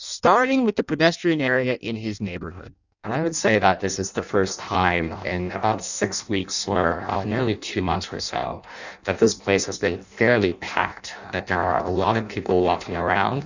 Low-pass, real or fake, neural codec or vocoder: 7.2 kHz; fake; codec, 16 kHz in and 24 kHz out, 0.6 kbps, FireRedTTS-2 codec